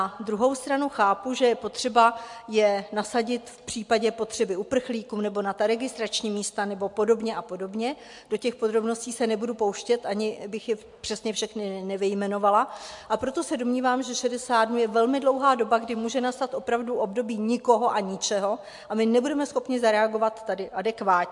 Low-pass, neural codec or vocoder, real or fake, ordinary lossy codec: 10.8 kHz; none; real; MP3, 64 kbps